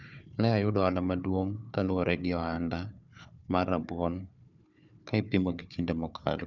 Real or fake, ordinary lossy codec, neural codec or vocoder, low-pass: fake; none; codec, 16 kHz, 4 kbps, FunCodec, trained on Chinese and English, 50 frames a second; 7.2 kHz